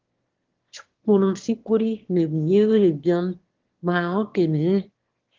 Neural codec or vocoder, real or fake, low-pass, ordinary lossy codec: autoencoder, 22.05 kHz, a latent of 192 numbers a frame, VITS, trained on one speaker; fake; 7.2 kHz; Opus, 16 kbps